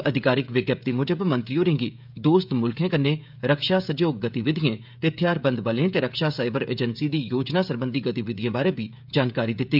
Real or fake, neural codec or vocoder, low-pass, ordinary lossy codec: fake; codec, 16 kHz, 16 kbps, FreqCodec, smaller model; 5.4 kHz; none